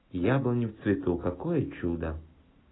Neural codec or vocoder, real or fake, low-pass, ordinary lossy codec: none; real; 7.2 kHz; AAC, 16 kbps